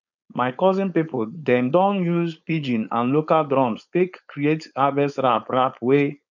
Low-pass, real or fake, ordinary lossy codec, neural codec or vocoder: 7.2 kHz; fake; none; codec, 16 kHz, 4.8 kbps, FACodec